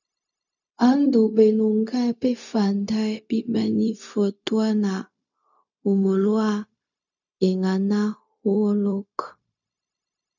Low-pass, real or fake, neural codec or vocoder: 7.2 kHz; fake; codec, 16 kHz, 0.4 kbps, LongCat-Audio-Codec